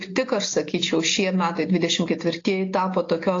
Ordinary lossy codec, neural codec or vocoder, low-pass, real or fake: AAC, 32 kbps; none; 7.2 kHz; real